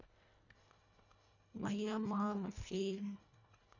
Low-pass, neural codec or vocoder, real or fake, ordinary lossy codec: 7.2 kHz; codec, 24 kHz, 1.5 kbps, HILCodec; fake; none